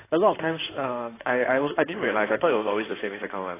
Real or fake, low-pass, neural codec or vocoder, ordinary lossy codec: fake; 3.6 kHz; codec, 16 kHz in and 24 kHz out, 2.2 kbps, FireRedTTS-2 codec; AAC, 16 kbps